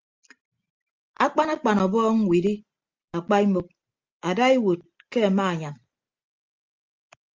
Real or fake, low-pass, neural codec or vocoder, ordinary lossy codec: real; 7.2 kHz; none; Opus, 32 kbps